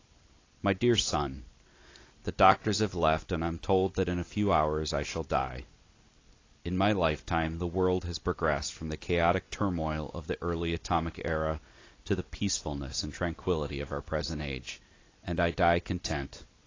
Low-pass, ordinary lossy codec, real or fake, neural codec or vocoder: 7.2 kHz; AAC, 32 kbps; real; none